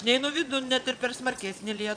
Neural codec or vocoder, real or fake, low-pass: vocoder, 22.05 kHz, 80 mel bands, Vocos; fake; 9.9 kHz